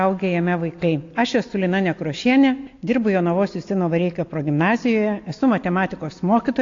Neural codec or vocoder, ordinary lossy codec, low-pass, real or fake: none; AAC, 48 kbps; 7.2 kHz; real